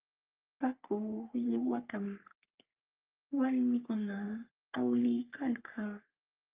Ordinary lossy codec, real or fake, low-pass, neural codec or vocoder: Opus, 24 kbps; fake; 3.6 kHz; codec, 44.1 kHz, 3.4 kbps, Pupu-Codec